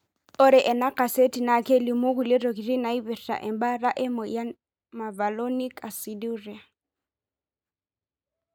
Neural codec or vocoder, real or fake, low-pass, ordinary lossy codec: none; real; none; none